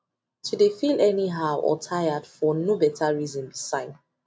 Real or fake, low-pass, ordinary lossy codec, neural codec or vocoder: real; none; none; none